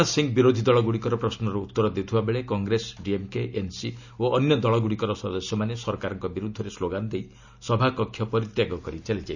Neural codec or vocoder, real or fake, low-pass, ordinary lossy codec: none; real; 7.2 kHz; none